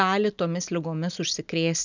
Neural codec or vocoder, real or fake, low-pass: none; real; 7.2 kHz